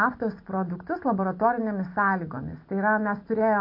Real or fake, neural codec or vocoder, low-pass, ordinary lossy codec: real; none; 5.4 kHz; MP3, 32 kbps